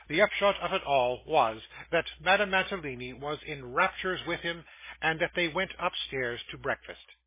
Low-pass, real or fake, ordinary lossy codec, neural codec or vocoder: 3.6 kHz; real; MP3, 16 kbps; none